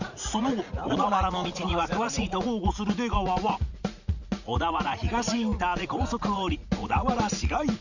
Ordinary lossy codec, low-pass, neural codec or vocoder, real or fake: none; 7.2 kHz; vocoder, 44.1 kHz, 80 mel bands, Vocos; fake